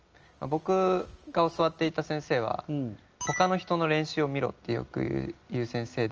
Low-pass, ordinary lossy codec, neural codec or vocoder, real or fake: 7.2 kHz; Opus, 24 kbps; none; real